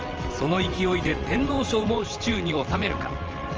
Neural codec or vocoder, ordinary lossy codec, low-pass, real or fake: vocoder, 22.05 kHz, 80 mel bands, WaveNeXt; Opus, 24 kbps; 7.2 kHz; fake